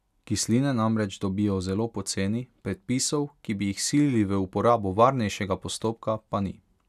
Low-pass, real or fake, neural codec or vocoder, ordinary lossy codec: 14.4 kHz; real; none; none